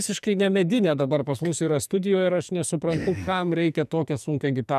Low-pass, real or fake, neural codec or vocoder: 14.4 kHz; fake; codec, 44.1 kHz, 2.6 kbps, SNAC